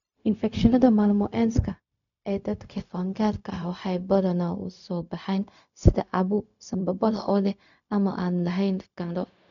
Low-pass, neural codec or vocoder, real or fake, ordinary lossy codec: 7.2 kHz; codec, 16 kHz, 0.4 kbps, LongCat-Audio-Codec; fake; none